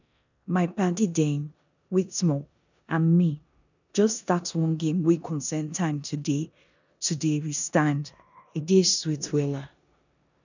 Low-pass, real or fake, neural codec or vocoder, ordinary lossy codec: 7.2 kHz; fake; codec, 16 kHz in and 24 kHz out, 0.9 kbps, LongCat-Audio-Codec, four codebook decoder; none